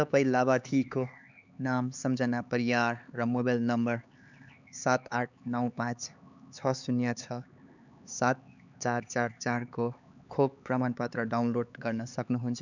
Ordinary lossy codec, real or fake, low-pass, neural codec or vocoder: none; fake; 7.2 kHz; codec, 16 kHz, 4 kbps, X-Codec, HuBERT features, trained on LibriSpeech